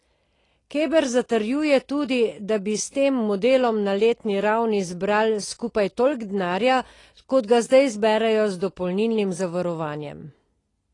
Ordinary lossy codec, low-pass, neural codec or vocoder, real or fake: AAC, 32 kbps; 10.8 kHz; none; real